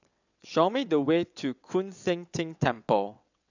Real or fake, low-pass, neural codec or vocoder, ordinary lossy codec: fake; 7.2 kHz; vocoder, 22.05 kHz, 80 mel bands, WaveNeXt; none